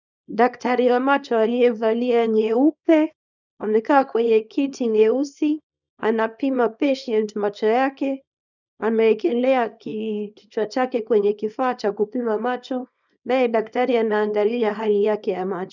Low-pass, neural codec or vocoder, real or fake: 7.2 kHz; codec, 24 kHz, 0.9 kbps, WavTokenizer, small release; fake